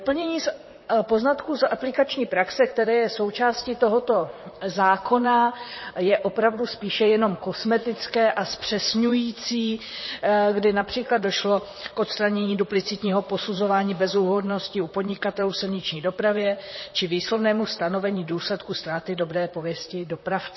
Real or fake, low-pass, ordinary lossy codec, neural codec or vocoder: fake; 7.2 kHz; MP3, 24 kbps; vocoder, 44.1 kHz, 128 mel bands, Pupu-Vocoder